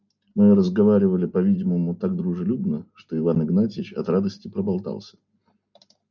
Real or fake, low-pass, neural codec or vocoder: real; 7.2 kHz; none